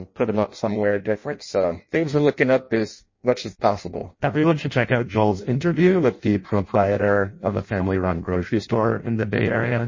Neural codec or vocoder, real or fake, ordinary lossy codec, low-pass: codec, 16 kHz in and 24 kHz out, 0.6 kbps, FireRedTTS-2 codec; fake; MP3, 32 kbps; 7.2 kHz